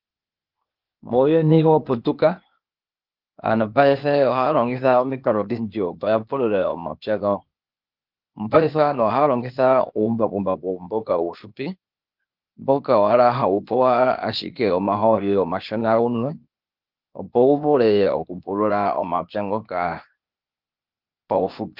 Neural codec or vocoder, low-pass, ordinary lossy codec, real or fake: codec, 16 kHz, 0.8 kbps, ZipCodec; 5.4 kHz; Opus, 32 kbps; fake